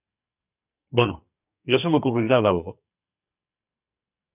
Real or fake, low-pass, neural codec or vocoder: fake; 3.6 kHz; codec, 44.1 kHz, 2.6 kbps, SNAC